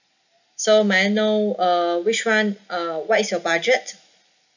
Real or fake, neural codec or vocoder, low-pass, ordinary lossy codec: real; none; 7.2 kHz; none